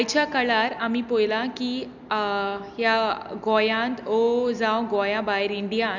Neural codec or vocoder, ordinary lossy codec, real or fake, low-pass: none; none; real; 7.2 kHz